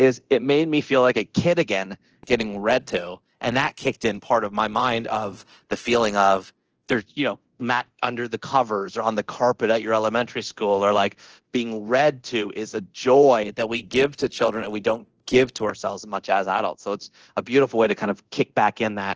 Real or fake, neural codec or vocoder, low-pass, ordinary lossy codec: fake; codec, 24 kHz, 0.9 kbps, DualCodec; 7.2 kHz; Opus, 16 kbps